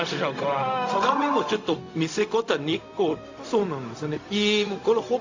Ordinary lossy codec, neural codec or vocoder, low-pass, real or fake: AAC, 48 kbps; codec, 16 kHz, 0.4 kbps, LongCat-Audio-Codec; 7.2 kHz; fake